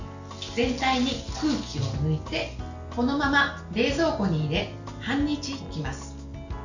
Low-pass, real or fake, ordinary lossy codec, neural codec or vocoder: 7.2 kHz; real; none; none